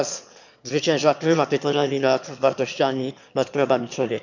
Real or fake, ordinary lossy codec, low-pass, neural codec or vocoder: fake; none; 7.2 kHz; autoencoder, 22.05 kHz, a latent of 192 numbers a frame, VITS, trained on one speaker